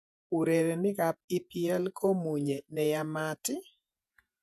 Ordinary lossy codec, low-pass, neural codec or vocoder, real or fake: none; 14.4 kHz; vocoder, 48 kHz, 128 mel bands, Vocos; fake